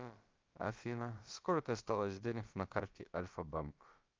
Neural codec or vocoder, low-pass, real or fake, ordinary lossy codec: codec, 16 kHz, about 1 kbps, DyCAST, with the encoder's durations; 7.2 kHz; fake; Opus, 32 kbps